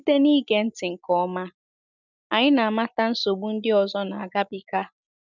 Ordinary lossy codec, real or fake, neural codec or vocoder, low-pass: none; real; none; 7.2 kHz